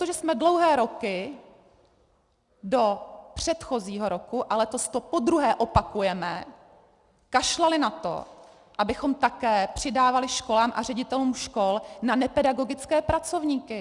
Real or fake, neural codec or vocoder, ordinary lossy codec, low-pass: real; none; Opus, 64 kbps; 10.8 kHz